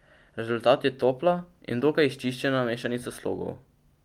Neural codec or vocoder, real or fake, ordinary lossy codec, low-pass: none; real; Opus, 32 kbps; 19.8 kHz